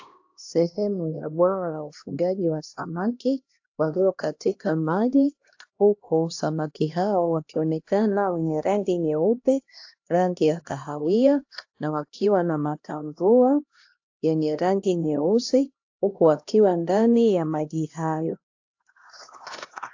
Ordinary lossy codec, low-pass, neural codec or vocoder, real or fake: AAC, 48 kbps; 7.2 kHz; codec, 16 kHz, 1 kbps, X-Codec, HuBERT features, trained on LibriSpeech; fake